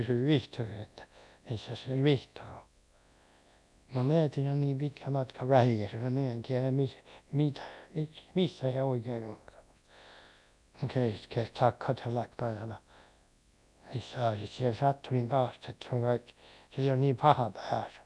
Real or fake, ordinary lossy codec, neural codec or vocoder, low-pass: fake; none; codec, 24 kHz, 0.9 kbps, WavTokenizer, large speech release; none